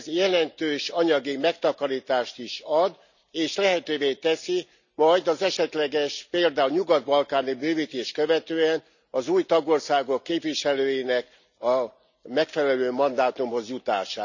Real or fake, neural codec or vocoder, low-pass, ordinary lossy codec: real; none; 7.2 kHz; none